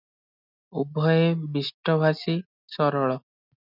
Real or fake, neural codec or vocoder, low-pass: real; none; 5.4 kHz